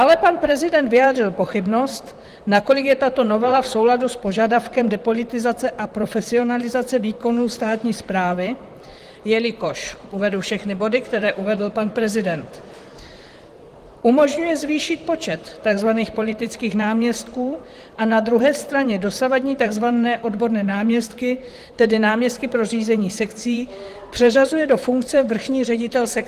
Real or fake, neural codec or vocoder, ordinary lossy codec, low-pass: fake; vocoder, 44.1 kHz, 128 mel bands, Pupu-Vocoder; Opus, 24 kbps; 14.4 kHz